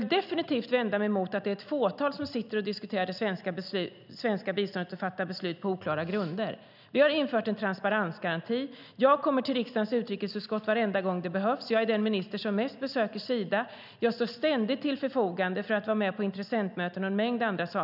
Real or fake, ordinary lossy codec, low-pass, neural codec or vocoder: real; none; 5.4 kHz; none